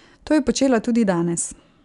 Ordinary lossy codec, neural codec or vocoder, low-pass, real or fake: none; none; 10.8 kHz; real